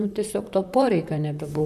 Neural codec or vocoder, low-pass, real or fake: vocoder, 44.1 kHz, 128 mel bands, Pupu-Vocoder; 14.4 kHz; fake